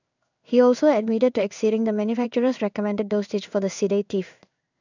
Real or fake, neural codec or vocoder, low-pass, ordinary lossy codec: fake; codec, 16 kHz in and 24 kHz out, 1 kbps, XY-Tokenizer; 7.2 kHz; none